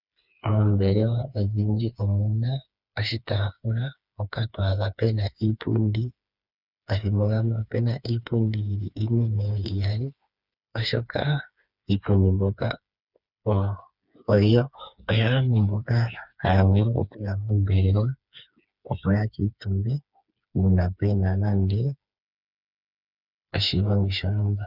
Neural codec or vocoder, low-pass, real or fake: codec, 16 kHz, 4 kbps, FreqCodec, smaller model; 5.4 kHz; fake